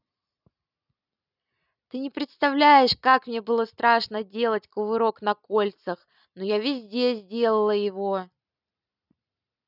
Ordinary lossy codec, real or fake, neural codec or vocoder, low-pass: none; real; none; 5.4 kHz